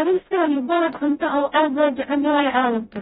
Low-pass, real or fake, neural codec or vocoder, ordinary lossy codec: 7.2 kHz; fake; codec, 16 kHz, 0.5 kbps, FreqCodec, smaller model; AAC, 16 kbps